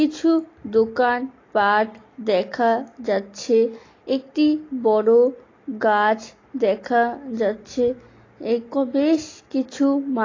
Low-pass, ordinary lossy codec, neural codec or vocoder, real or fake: 7.2 kHz; AAC, 32 kbps; none; real